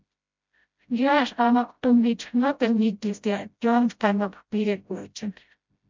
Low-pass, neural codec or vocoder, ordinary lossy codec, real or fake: 7.2 kHz; codec, 16 kHz, 0.5 kbps, FreqCodec, smaller model; MP3, 64 kbps; fake